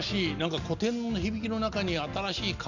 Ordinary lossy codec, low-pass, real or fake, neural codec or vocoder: none; 7.2 kHz; real; none